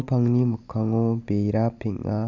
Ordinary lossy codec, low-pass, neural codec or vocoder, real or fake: none; 7.2 kHz; none; real